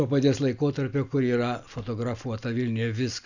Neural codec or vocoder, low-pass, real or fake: none; 7.2 kHz; real